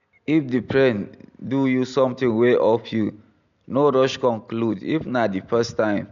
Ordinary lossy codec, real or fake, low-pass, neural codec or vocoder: none; real; 7.2 kHz; none